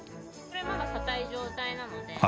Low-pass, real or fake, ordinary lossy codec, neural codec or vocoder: none; real; none; none